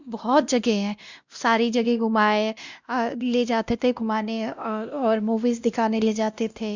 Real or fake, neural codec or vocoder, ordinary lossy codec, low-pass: fake; codec, 16 kHz, 1 kbps, X-Codec, WavLM features, trained on Multilingual LibriSpeech; Opus, 64 kbps; 7.2 kHz